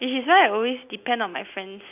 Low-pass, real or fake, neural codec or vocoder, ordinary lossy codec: 3.6 kHz; real; none; none